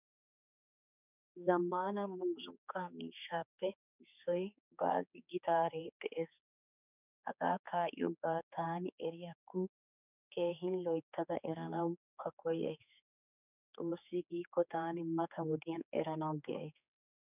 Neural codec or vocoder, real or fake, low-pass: codec, 16 kHz, 4 kbps, X-Codec, HuBERT features, trained on general audio; fake; 3.6 kHz